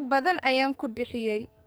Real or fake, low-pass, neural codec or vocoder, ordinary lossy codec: fake; none; codec, 44.1 kHz, 2.6 kbps, SNAC; none